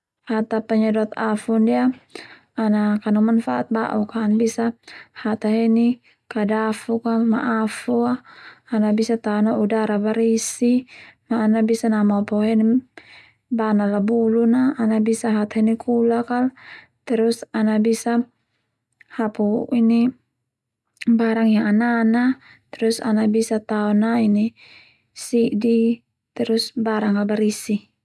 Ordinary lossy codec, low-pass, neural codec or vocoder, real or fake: none; none; none; real